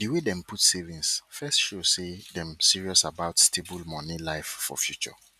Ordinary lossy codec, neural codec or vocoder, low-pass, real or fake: none; none; 14.4 kHz; real